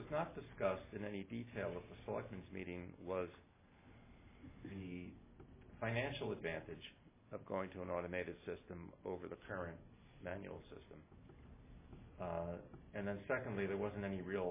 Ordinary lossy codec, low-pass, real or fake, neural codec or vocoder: MP3, 16 kbps; 3.6 kHz; real; none